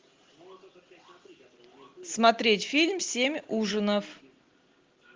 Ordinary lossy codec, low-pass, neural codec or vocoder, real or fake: Opus, 16 kbps; 7.2 kHz; none; real